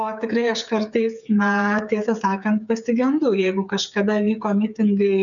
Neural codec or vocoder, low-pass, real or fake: codec, 16 kHz, 8 kbps, FreqCodec, smaller model; 7.2 kHz; fake